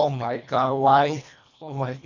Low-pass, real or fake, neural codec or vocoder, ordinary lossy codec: 7.2 kHz; fake; codec, 24 kHz, 1.5 kbps, HILCodec; none